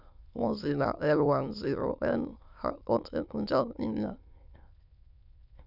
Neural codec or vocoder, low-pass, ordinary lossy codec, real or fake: autoencoder, 22.05 kHz, a latent of 192 numbers a frame, VITS, trained on many speakers; 5.4 kHz; none; fake